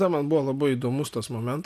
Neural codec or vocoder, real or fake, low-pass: none; real; 14.4 kHz